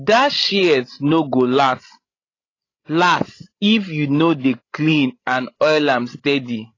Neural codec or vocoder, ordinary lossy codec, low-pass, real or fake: codec, 16 kHz, 16 kbps, FreqCodec, larger model; AAC, 32 kbps; 7.2 kHz; fake